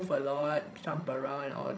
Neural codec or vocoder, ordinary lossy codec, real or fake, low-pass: codec, 16 kHz, 16 kbps, FreqCodec, larger model; none; fake; none